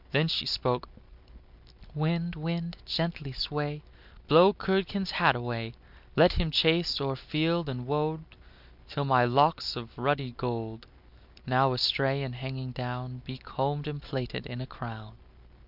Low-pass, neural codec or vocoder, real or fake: 5.4 kHz; none; real